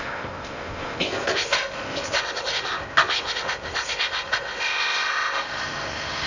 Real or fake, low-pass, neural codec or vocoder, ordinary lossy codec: fake; 7.2 kHz; codec, 16 kHz in and 24 kHz out, 0.6 kbps, FocalCodec, streaming, 2048 codes; none